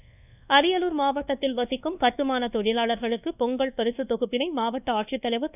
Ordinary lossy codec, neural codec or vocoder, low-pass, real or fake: none; codec, 24 kHz, 1.2 kbps, DualCodec; 3.6 kHz; fake